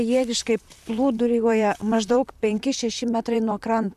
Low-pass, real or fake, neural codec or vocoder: 14.4 kHz; fake; vocoder, 44.1 kHz, 128 mel bands, Pupu-Vocoder